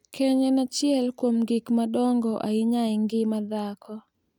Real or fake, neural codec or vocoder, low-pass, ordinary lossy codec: real; none; 19.8 kHz; none